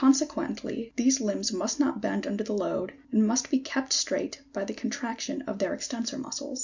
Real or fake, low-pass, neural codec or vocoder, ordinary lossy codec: real; 7.2 kHz; none; Opus, 64 kbps